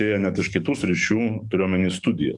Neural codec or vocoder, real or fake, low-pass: codec, 44.1 kHz, 7.8 kbps, DAC; fake; 10.8 kHz